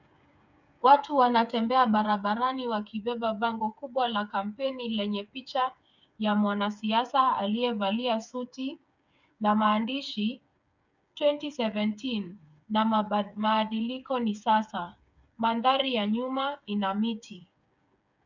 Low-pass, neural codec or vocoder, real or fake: 7.2 kHz; codec, 16 kHz, 8 kbps, FreqCodec, smaller model; fake